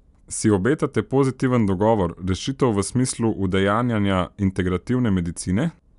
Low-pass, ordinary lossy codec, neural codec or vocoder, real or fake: 10.8 kHz; MP3, 96 kbps; none; real